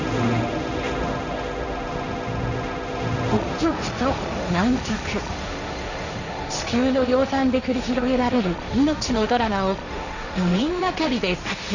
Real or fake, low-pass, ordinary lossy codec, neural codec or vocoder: fake; 7.2 kHz; none; codec, 16 kHz, 1.1 kbps, Voila-Tokenizer